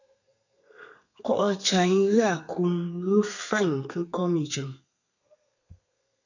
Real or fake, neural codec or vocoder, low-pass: fake; codec, 32 kHz, 1.9 kbps, SNAC; 7.2 kHz